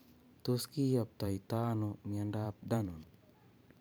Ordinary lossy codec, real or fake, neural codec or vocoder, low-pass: none; fake; vocoder, 44.1 kHz, 128 mel bands every 512 samples, BigVGAN v2; none